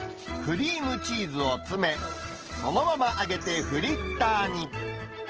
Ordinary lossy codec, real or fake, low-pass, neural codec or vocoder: Opus, 16 kbps; real; 7.2 kHz; none